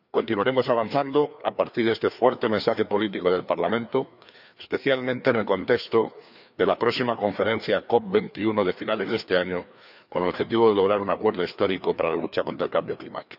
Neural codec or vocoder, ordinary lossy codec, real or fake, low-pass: codec, 16 kHz, 2 kbps, FreqCodec, larger model; none; fake; 5.4 kHz